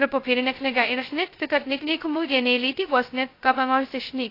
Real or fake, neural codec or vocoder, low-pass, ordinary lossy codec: fake; codec, 16 kHz, 0.2 kbps, FocalCodec; 5.4 kHz; AAC, 32 kbps